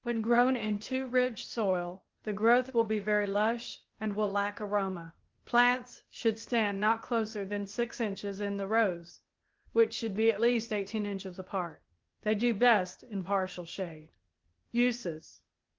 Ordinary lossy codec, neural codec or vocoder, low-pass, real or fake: Opus, 16 kbps; codec, 16 kHz, 0.8 kbps, ZipCodec; 7.2 kHz; fake